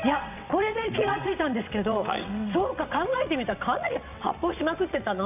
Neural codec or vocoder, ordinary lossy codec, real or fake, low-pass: vocoder, 22.05 kHz, 80 mel bands, WaveNeXt; none; fake; 3.6 kHz